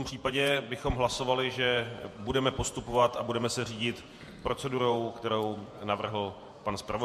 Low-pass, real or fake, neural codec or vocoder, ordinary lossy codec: 14.4 kHz; fake; vocoder, 48 kHz, 128 mel bands, Vocos; MP3, 64 kbps